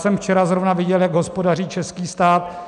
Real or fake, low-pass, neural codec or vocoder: real; 10.8 kHz; none